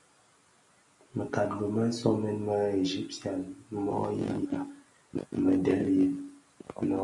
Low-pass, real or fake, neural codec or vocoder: 10.8 kHz; real; none